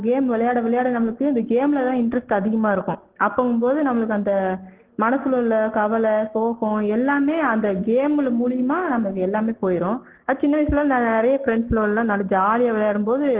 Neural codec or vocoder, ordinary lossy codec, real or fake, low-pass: none; Opus, 16 kbps; real; 3.6 kHz